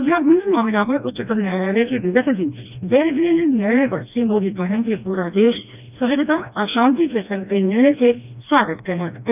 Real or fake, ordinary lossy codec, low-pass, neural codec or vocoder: fake; none; 3.6 kHz; codec, 16 kHz, 1 kbps, FreqCodec, smaller model